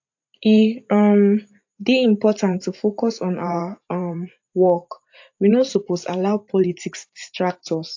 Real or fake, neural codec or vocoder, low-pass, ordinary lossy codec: fake; vocoder, 44.1 kHz, 128 mel bands every 512 samples, BigVGAN v2; 7.2 kHz; AAC, 48 kbps